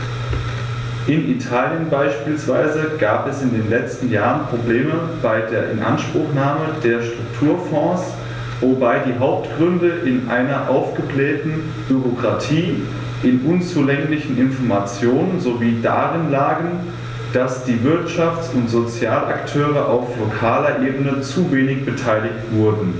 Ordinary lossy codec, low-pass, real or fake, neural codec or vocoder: none; none; real; none